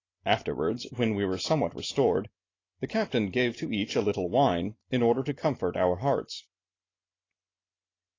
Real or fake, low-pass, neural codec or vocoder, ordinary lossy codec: real; 7.2 kHz; none; AAC, 32 kbps